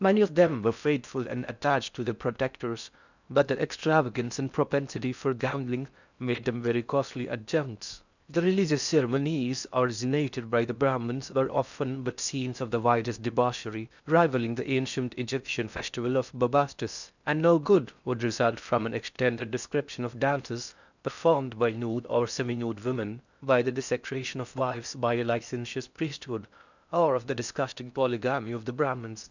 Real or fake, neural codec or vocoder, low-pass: fake; codec, 16 kHz in and 24 kHz out, 0.6 kbps, FocalCodec, streaming, 4096 codes; 7.2 kHz